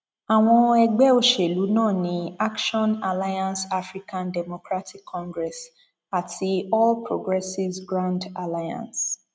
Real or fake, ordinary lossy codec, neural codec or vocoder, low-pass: real; none; none; none